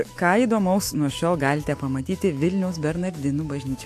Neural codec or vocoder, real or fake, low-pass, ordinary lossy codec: autoencoder, 48 kHz, 128 numbers a frame, DAC-VAE, trained on Japanese speech; fake; 14.4 kHz; AAC, 64 kbps